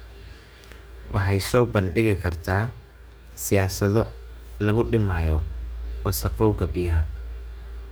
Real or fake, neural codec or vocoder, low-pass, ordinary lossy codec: fake; codec, 44.1 kHz, 2.6 kbps, DAC; none; none